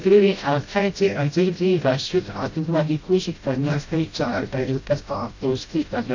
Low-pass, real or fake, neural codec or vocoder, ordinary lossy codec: 7.2 kHz; fake; codec, 16 kHz, 0.5 kbps, FreqCodec, smaller model; AAC, 48 kbps